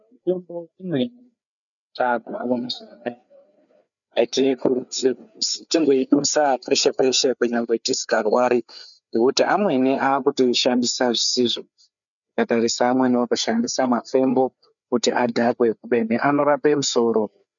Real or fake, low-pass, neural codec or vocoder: fake; 7.2 kHz; codec, 16 kHz, 4 kbps, FreqCodec, larger model